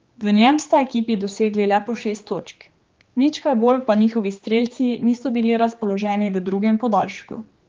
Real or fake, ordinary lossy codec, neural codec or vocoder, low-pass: fake; Opus, 32 kbps; codec, 16 kHz, 2 kbps, X-Codec, HuBERT features, trained on general audio; 7.2 kHz